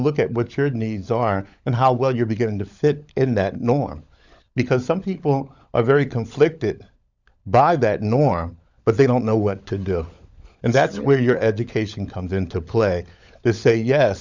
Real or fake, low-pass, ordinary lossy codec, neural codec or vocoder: fake; 7.2 kHz; Opus, 64 kbps; codec, 16 kHz, 16 kbps, FunCodec, trained on LibriTTS, 50 frames a second